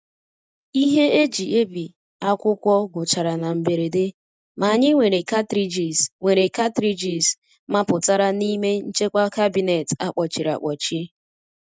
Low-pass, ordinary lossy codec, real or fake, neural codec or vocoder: none; none; real; none